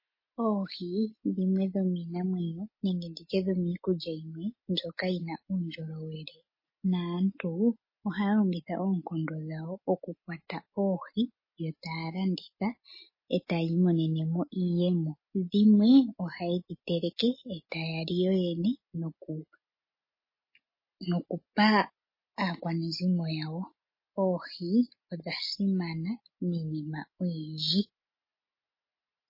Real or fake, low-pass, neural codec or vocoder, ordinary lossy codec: real; 5.4 kHz; none; MP3, 24 kbps